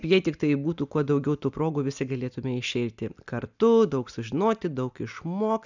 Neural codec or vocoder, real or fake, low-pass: none; real; 7.2 kHz